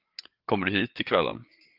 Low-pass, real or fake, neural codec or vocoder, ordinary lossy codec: 5.4 kHz; fake; codec, 16 kHz, 8 kbps, FreqCodec, larger model; Opus, 32 kbps